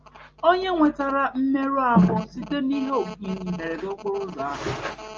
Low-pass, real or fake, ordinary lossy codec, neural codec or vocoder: 7.2 kHz; real; Opus, 24 kbps; none